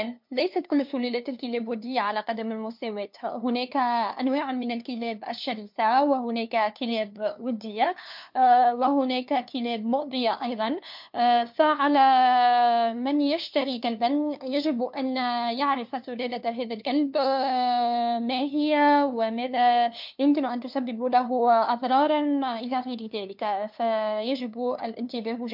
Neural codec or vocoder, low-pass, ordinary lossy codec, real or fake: codec, 16 kHz, 2 kbps, FunCodec, trained on LibriTTS, 25 frames a second; 5.4 kHz; MP3, 48 kbps; fake